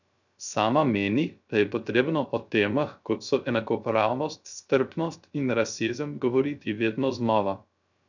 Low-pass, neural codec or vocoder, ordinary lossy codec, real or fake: 7.2 kHz; codec, 16 kHz, 0.3 kbps, FocalCodec; none; fake